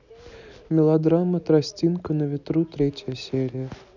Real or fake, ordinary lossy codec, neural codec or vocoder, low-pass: real; none; none; 7.2 kHz